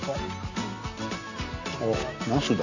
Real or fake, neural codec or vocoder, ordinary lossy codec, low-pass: real; none; none; 7.2 kHz